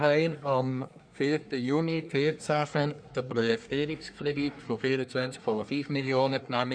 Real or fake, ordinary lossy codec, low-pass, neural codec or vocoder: fake; none; 9.9 kHz; codec, 24 kHz, 1 kbps, SNAC